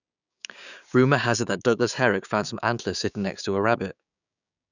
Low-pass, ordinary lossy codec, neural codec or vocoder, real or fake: 7.2 kHz; none; codec, 16 kHz, 6 kbps, DAC; fake